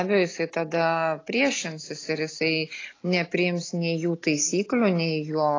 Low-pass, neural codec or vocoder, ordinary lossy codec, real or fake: 7.2 kHz; none; AAC, 32 kbps; real